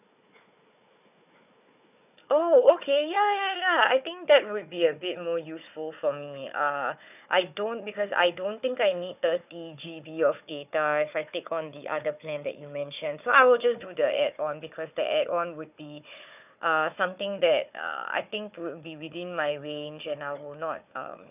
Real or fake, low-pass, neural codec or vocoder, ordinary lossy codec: fake; 3.6 kHz; codec, 16 kHz, 4 kbps, FunCodec, trained on Chinese and English, 50 frames a second; none